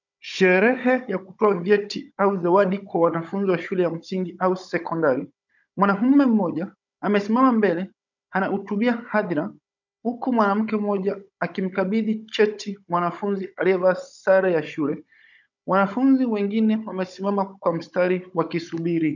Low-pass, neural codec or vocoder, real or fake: 7.2 kHz; codec, 16 kHz, 16 kbps, FunCodec, trained on Chinese and English, 50 frames a second; fake